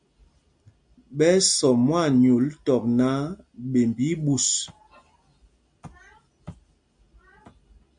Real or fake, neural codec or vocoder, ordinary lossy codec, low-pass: real; none; MP3, 64 kbps; 9.9 kHz